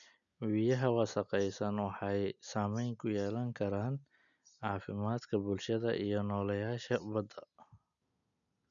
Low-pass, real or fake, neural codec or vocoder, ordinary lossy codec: 7.2 kHz; real; none; none